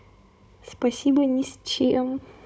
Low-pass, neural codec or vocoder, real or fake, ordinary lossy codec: none; codec, 16 kHz, 16 kbps, FunCodec, trained on Chinese and English, 50 frames a second; fake; none